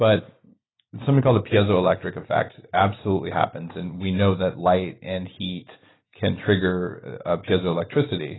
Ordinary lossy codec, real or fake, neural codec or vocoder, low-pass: AAC, 16 kbps; real; none; 7.2 kHz